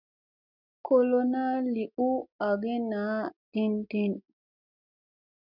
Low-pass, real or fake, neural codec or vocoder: 5.4 kHz; real; none